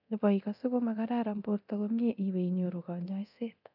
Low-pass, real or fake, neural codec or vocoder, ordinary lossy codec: 5.4 kHz; fake; codec, 24 kHz, 0.9 kbps, DualCodec; none